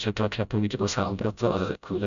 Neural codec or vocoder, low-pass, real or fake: codec, 16 kHz, 0.5 kbps, FreqCodec, smaller model; 7.2 kHz; fake